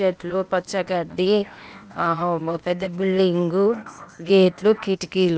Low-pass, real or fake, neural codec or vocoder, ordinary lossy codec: none; fake; codec, 16 kHz, 0.8 kbps, ZipCodec; none